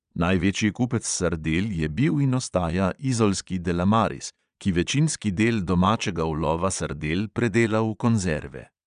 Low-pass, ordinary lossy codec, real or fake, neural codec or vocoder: 9.9 kHz; none; fake; vocoder, 22.05 kHz, 80 mel bands, Vocos